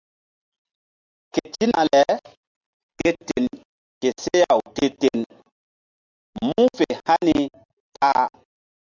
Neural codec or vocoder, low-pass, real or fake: none; 7.2 kHz; real